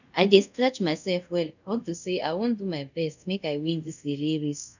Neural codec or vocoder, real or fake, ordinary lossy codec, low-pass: codec, 24 kHz, 0.5 kbps, DualCodec; fake; none; 7.2 kHz